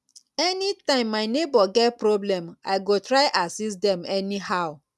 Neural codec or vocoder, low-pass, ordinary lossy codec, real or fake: none; none; none; real